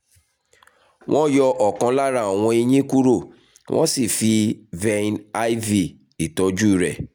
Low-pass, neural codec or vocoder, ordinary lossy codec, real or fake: none; none; none; real